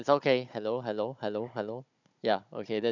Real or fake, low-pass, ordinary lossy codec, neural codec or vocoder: fake; 7.2 kHz; none; codec, 16 kHz, 16 kbps, FunCodec, trained on Chinese and English, 50 frames a second